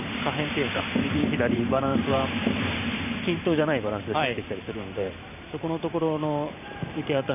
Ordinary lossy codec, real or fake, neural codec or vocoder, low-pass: none; fake; vocoder, 44.1 kHz, 128 mel bands every 512 samples, BigVGAN v2; 3.6 kHz